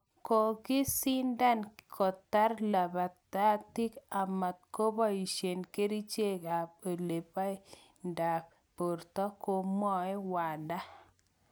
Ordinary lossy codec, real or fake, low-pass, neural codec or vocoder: none; real; none; none